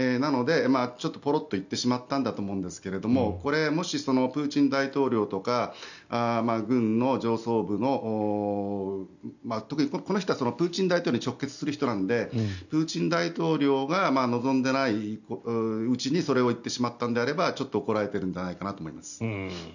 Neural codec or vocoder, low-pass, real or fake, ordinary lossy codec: none; 7.2 kHz; real; none